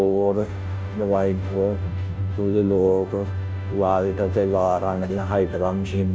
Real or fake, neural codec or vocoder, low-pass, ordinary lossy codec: fake; codec, 16 kHz, 0.5 kbps, FunCodec, trained on Chinese and English, 25 frames a second; none; none